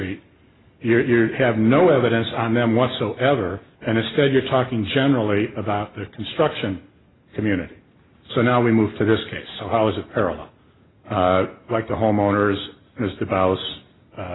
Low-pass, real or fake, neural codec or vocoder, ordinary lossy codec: 7.2 kHz; real; none; AAC, 16 kbps